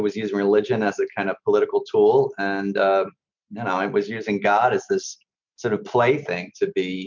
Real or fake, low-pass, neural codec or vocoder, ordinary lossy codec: real; 7.2 kHz; none; MP3, 64 kbps